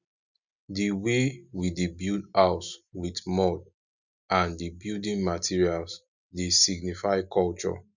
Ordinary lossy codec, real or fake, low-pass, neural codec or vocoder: none; real; 7.2 kHz; none